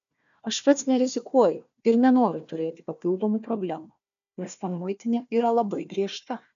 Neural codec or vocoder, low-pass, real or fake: codec, 16 kHz, 1 kbps, FunCodec, trained on Chinese and English, 50 frames a second; 7.2 kHz; fake